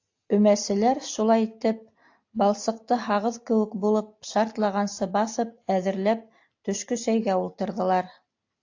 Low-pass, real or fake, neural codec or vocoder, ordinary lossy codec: 7.2 kHz; real; none; AAC, 48 kbps